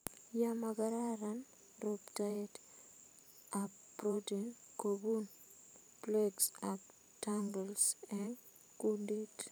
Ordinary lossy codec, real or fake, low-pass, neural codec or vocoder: none; fake; none; vocoder, 44.1 kHz, 128 mel bands every 512 samples, BigVGAN v2